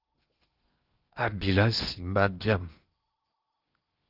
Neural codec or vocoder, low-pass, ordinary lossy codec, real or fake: codec, 16 kHz in and 24 kHz out, 0.8 kbps, FocalCodec, streaming, 65536 codes; 5.4 kHz; Opus, 24 kbps; fake